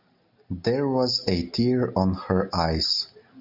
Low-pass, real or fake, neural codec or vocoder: 5.4 kHz; real; none